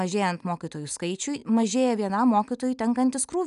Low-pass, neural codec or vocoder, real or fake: 10.8 kHz; none; real